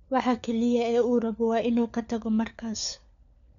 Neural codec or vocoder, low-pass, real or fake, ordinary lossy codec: codec, 16 kHz, 4 kbps, FunCodec, trained on LibriTTS, 50 frames a second; 7.2 kHz; fake; none